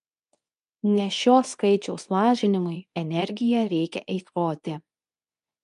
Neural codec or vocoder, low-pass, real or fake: codec, 24 kHz, 0.9 kbps, WavTokenizer, medium speech release version 2; 10.8 kHz; fake